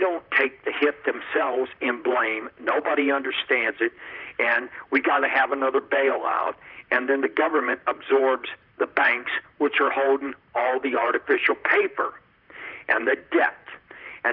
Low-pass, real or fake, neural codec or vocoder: 5.4 kHz; fake; vocoder, 44.1 kHz, 128 mel bands, Pupu-Vocoder